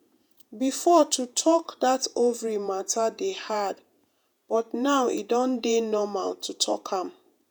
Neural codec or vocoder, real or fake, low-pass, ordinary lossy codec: vocoder, 48 kHz, 128 mel bands, Vocos; fake; none; none